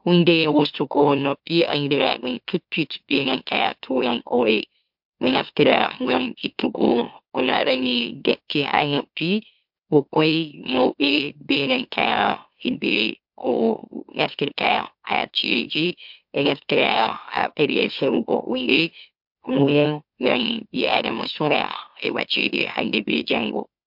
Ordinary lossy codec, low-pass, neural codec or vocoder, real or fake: MP3, 48 kbps; 5.4 kHz; autoencoder, 44.1 kHz, a latent of 192 numbers a frame, MeloTTS; fake